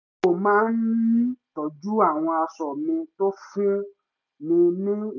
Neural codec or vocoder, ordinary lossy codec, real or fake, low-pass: none; none; real; 7.2 kHz